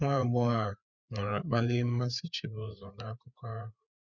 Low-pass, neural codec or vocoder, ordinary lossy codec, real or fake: 7.2 kHz; codec, 16 kHz, 4 kbps, FreqCodec, larger model; none; fake